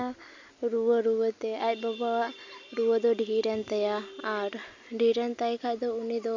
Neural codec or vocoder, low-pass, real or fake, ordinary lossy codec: none; 7.2 kHz; real; MP3, 64 kbps